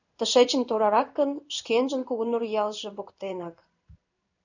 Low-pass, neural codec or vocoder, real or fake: 7.2 kHz; codec, 16 kHz in and 24 kHz out, 1 kbps, XY-Tokenizer; fake